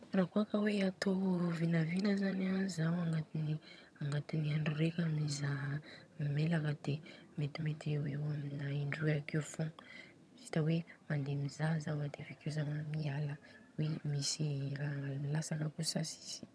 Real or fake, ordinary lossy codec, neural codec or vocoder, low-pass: fake; none; vocoder, 22.05 kHz, 80 mel bands, HiFi-GAN; none